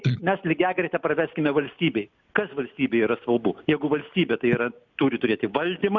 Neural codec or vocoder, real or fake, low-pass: none; real; 7.2 kHz